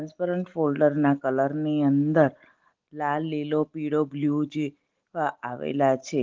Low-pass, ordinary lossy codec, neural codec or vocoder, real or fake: 7.2 kHz; Opus, 16 kbps; none; real